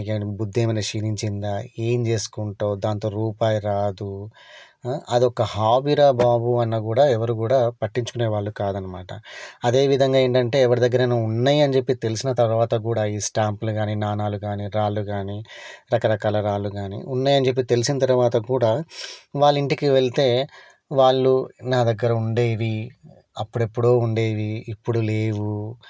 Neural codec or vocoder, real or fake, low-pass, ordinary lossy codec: none; real; none; none